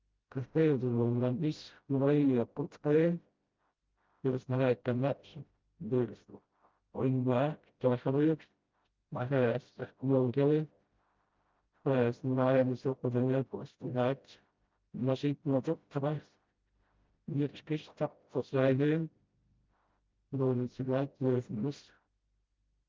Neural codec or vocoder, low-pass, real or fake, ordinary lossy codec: codec, 16 kHz, 0.5 kbps, FreqCodec, smaller model; 7.2 kHz; fake; Opus, 32 kbps